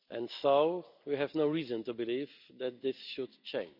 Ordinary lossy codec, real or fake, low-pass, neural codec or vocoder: none; real; 5.4 kHz; none